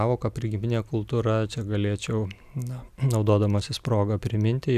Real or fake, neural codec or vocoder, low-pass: real; none; 14.4 kHz